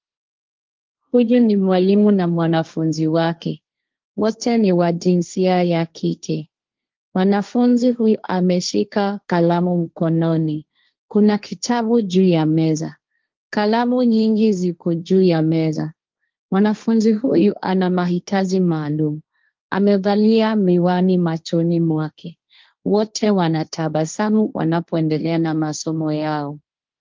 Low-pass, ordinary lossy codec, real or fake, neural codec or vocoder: 7.2 kHz; Opus, 24 kbps; fake; codec, 16 kHz, 1.1 kbps, Voila-Tokenizer